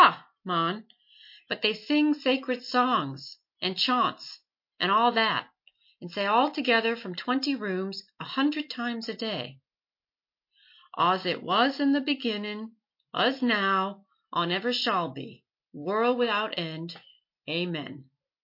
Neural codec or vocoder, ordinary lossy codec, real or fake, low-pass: none; MP3, 32 kbps; real; 5.4 kHz